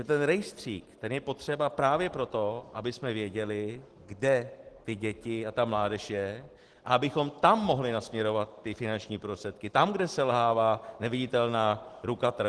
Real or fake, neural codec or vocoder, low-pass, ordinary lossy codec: real; none; 10.8 kHz; Opus, 16 kbps